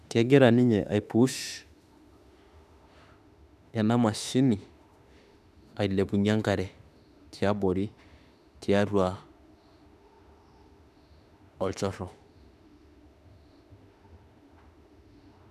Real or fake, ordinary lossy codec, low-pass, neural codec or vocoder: fake; none; 14.4 kHz; autoencoder, 48 kHz, 32 numbers a frame, DAC-VAE, trained on Japanese speech